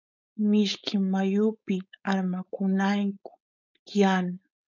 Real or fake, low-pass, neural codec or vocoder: fake; 7.2 kHz; codec, 16 kHz, 4.8 kbps, FACodec